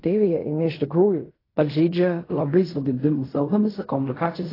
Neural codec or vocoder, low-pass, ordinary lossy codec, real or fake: codec, 16 kHz in and 24 kHz out, 0.4 kbps, LongCat-Audio-Codec, fine tuned four codebook decoder; 5.4 kHz; AAC, 24 kbps; fake